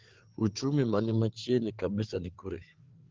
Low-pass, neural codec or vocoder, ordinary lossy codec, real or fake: 7.2 kHz; codec, 16 kHz, 4 kbps, FreqCodec, larger model; Opus, 16 kbps; fake